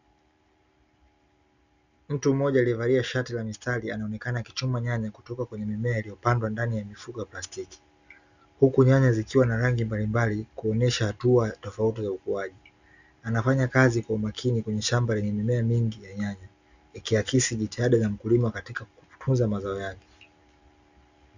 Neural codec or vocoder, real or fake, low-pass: none; real; 7.2 kHz